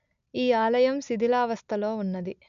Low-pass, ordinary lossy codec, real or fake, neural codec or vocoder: 7.2 kHz; none; real; none